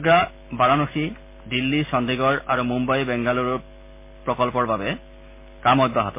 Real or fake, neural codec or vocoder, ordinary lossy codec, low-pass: real; none; MP3, 24 kbps; 3.6 kHz